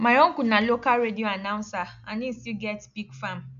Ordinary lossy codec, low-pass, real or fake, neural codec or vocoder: none; 7.2 kHz; real; none